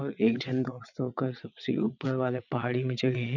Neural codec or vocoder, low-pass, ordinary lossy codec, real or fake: vocoder, 22.05 kHz, 80 mel bands, WaveNeXt; 7.2 kHz; none; fake